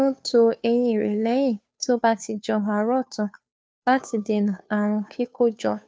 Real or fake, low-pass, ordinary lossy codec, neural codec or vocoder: fake; none; none; codec, 16 kHz, 2 kbps, FunCodec, trained on Chinese and English, 25 frames a second